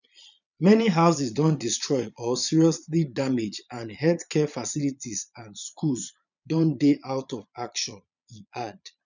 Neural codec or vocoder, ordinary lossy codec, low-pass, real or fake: vocoder, 22.05 kHz, 80 mel bands, Vocos; none; 7.2 kHz; fake